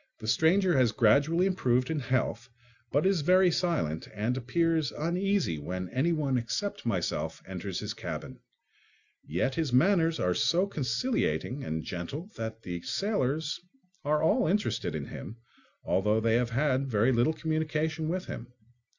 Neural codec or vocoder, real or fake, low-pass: none; real; 7.2 kHz